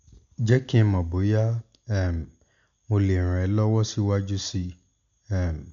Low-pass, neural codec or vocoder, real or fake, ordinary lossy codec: 7.2 kHz; none; real; none